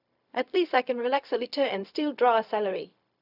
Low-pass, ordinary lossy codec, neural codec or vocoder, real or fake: 5.4 kHz; none; codec, 16 kHz, 0.4 kbps, LongCat-Audio-Codec; fake